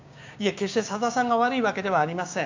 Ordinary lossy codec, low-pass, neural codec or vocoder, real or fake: MP3, 64 kbps; 7.2 kHz; codec, 16 kHz, 6 kbps, DAC; fake